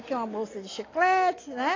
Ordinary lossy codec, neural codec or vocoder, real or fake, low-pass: AAC, 32 kbps; none; real; 7.2 kHz